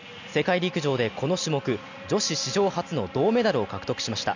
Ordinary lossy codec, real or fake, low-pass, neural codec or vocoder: none; real; 7.2 kHz; none